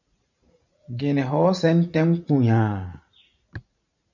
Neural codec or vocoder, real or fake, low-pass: vocoder, 44.1 kHz, 80 mel bands, Vocos; fake; 7.2 kHz